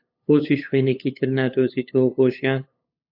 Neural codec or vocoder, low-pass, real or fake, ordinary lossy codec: codec, 16 kHz, 8 kbps, FunCodec, trained on LibriTTS, 25 frames a second; 5.4 kHz; fake; AAC, 48 kbps